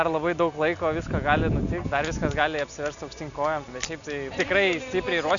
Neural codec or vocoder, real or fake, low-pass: none; real; 7.2 kHz